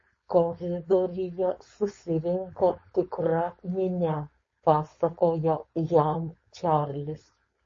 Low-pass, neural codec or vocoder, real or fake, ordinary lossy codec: 7.2 kHz; codec, 16 kHz, 4.8 kbps, FACodec; fake; MP3, 32 kbps